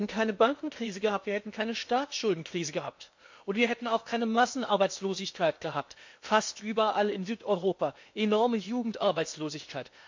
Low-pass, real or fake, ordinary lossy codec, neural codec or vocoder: 7.2 kHz; fake; MP3, 48 kbps; codec, 16 kHz in and 24 kHz out, 0.8 kbps, FocalCodec, streaming, 65536 codes